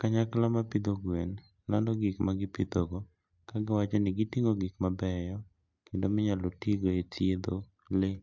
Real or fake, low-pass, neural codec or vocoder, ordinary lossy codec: real; 7.2 kHz; none; MP3, 64 kbps